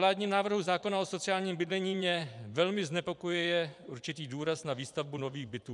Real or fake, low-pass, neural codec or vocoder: fake; 10.8 kHz; vocoder, 24 kHz, 100 mel bands, Vocos